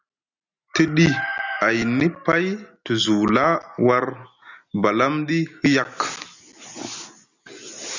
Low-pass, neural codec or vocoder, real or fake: 7.2 kHz; none; real